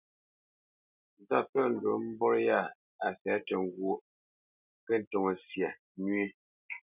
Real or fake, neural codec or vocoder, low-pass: real; none; 3.6 kHz